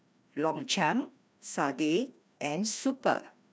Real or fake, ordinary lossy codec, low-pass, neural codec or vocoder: fake; none; none; codec, 16 kHz, 1 kbps, FreqCodec, larger model